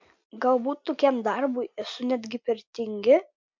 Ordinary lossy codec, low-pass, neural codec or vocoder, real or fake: MP3, 64 kbps; 7.2 kHz; none; real